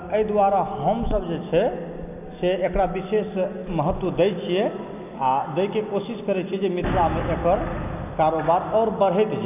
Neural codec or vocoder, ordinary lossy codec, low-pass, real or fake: none; none; 3.6 kHz; real